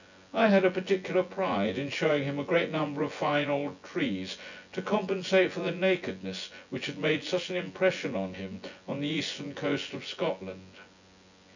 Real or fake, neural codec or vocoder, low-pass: fake; vocoder, 24 kHz, 100 mel bands, Vocos; 7.2 kHz